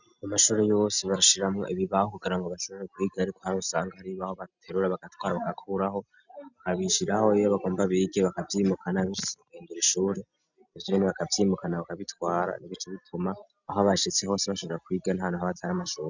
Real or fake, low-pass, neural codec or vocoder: real; 7.2 kHz; none